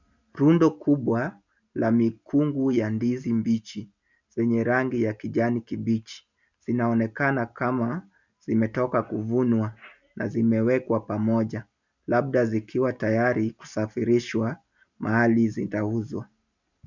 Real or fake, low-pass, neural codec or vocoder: real; 7.2 kHz; none